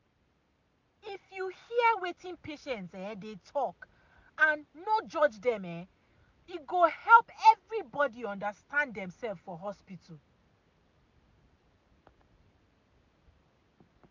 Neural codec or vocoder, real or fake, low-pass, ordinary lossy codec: none; real; 7.2 kHz; MP3, 64 kbps